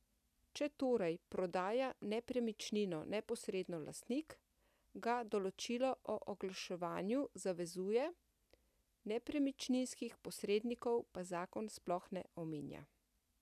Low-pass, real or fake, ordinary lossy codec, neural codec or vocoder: 14.4 kHz; real; none; none